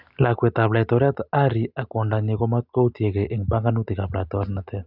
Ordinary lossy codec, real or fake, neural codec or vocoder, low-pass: none; real; none; 5.4 kHz